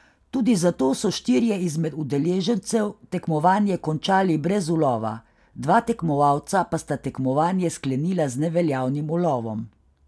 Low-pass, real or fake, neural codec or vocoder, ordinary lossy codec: none; real; none; none